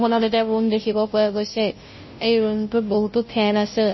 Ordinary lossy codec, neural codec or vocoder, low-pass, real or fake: MP3, 24 kbps; codec, 16 kHz, 0.5 kbps, FunCodec, trained on Chinese and English, 25 frames a second; 7.2 kHz; fake